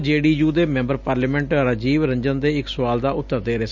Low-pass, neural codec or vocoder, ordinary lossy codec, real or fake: 7.2 kHz; none; none; real